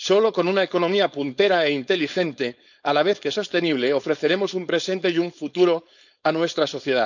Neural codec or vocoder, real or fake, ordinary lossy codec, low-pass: codec, 16 kHz, 4.8 kbps, FACodec; fake; none; 7.2 kHz